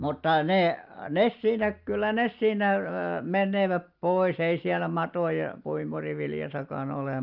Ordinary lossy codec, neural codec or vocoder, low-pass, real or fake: Opus, 24 kbps; none; 5.4 kHz; real